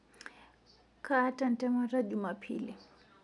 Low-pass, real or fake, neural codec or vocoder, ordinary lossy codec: 10.8 kHz; real; none; none